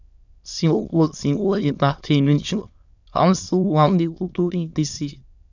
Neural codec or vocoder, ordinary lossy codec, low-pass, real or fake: autoencoder, 22.05 kHz, a latent of 192 numbers a frame, VITS, trained on many speakers; none; 7.2 kHz; fake